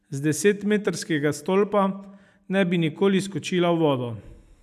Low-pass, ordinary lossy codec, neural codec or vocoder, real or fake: 14.4 kHz; none; none; real